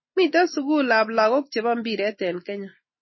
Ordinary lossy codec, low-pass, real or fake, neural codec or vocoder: MP3, 24 kbps; 7.2 kHz; real; none